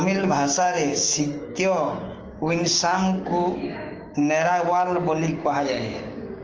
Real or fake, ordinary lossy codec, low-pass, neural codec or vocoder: fake; Opus, 24 kbps; 7.2 kHz; codec, 16 kHz, 6 kbps, DAC